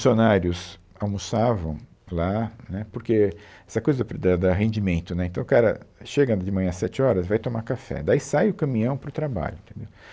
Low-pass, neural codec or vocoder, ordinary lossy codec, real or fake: none; none; none; real